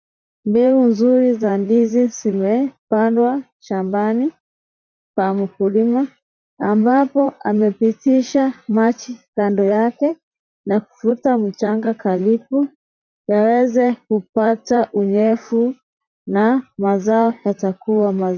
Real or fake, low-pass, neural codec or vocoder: fake; 7.2 kHz; vocoder, 44.1 kHz, 128 mel bands, Pupu-Vocoder